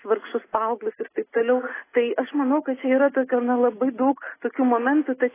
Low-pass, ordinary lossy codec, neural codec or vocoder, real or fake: 3.6 kHz; AAC, 16 kbps; none; real